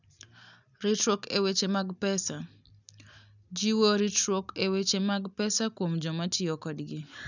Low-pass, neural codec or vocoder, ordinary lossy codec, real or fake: 7.2 kHz; none; none; real